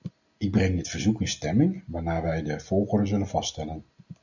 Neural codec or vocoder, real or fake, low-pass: none; real; 7.2 kHz